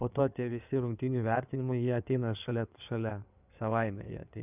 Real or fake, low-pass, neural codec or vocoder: fake; 3.6 kHz; codec, 16 kHz in and 24 kHz out, 2.2 kbps, FireRedTTS-2 codec